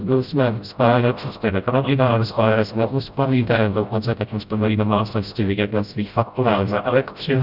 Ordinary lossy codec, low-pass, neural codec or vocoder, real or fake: Opus, 64 kbps; 5.4 kHz; codec, 16 kHz, 0.5 kbps, FreqCodec, smaller model; fake